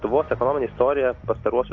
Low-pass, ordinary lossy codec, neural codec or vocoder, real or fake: 7.2 kHz; AAC, 48 kbps; none; real